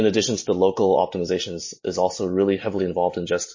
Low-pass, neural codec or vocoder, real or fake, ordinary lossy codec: 7.2 kHz; none; real; MP3, 32 kbps